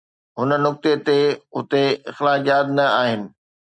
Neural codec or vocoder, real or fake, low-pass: none; real; 9.9 kHz